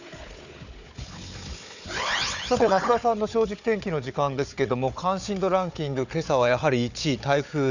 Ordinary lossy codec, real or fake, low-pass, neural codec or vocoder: none; fake; 7.2 kHz; codec, 16 kHz, 4 kbps, FunCodec, trained on Chinese and English, 50 frames a second